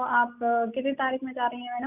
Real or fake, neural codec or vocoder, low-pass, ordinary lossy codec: real; none; 3.6 kHz; none